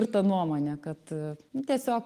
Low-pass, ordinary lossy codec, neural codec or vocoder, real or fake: 14.4 kHz; Opus, 24 kbps; none; real